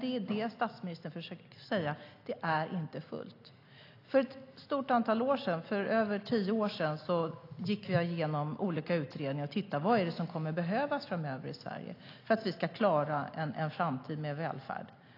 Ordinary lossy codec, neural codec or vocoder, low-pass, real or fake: AAC, 32 kbps; none; 5.4 kHz; real